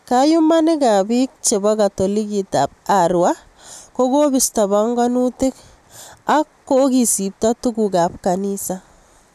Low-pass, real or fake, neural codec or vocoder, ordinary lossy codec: 14.4 kHz; real; none; none